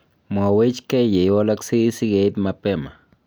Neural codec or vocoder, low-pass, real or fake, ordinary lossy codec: none; none; real; none